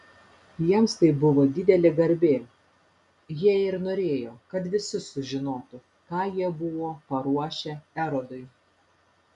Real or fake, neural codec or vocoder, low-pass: real; none; 10.8 kHz